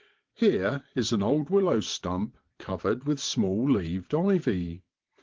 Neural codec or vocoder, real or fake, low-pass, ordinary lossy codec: vocoder, 22.05 kHz, 80 mel bands, WaveNeXt; fake; 7.2 kHz; Opus, 16 kbps